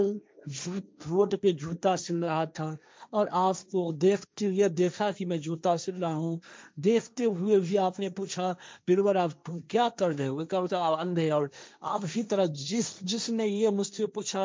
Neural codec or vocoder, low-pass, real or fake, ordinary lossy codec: codec, 16 kHz, 1.1 kbps, Voila-Tokenizer; none; fake; none